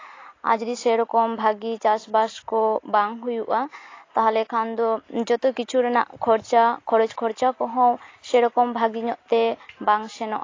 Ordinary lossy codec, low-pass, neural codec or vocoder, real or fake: AAC, 32 kbps; 7.2 kHz; none; real